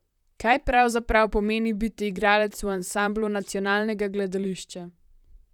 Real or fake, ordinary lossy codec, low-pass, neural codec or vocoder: fake; none; 19.8 kHz; vocoder, 44.1 kHz, 128 mel bands, Pupu-Vocoder